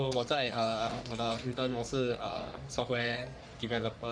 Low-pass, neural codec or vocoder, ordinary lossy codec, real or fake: 9.9 kHz; codec, 44.1 kHz, 3.4 kbps, Pupu-Codec; none; fake